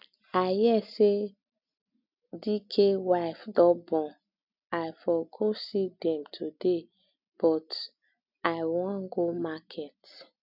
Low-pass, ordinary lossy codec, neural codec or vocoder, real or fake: 5.4 kHz; none; none; real